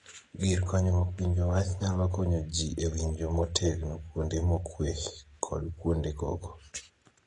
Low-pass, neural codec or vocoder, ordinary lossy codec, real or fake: 10.8 kHz; none; AAC, 32 kbps; real